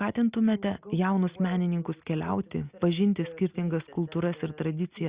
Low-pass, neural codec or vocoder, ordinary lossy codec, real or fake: 3.6 kHz; none; Opus, 64 kbps; real